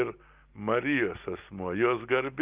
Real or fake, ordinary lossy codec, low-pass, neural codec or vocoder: real; Opus, 32 kbps; 3.6 kHz; none